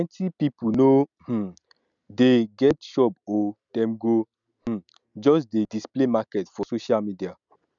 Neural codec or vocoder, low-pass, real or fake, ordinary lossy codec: none; 7.2 kHz; real; none